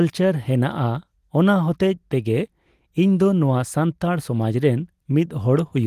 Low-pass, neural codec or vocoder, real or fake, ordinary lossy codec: 14.4 kHz; none; real; Opus, 24 kbps